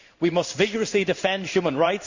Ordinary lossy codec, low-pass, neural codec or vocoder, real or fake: Opus, 64 kbps; 7.2 kHz; codec, 16 kHz in and 24 kHz out, 1 kbps, XY-Tokenizer; fake